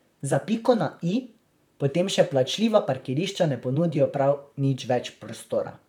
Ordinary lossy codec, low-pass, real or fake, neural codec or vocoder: none; 19.8 kHz; fake; vocoder, 44.1 kHz, 128 mel bands, Pupu-Vocoder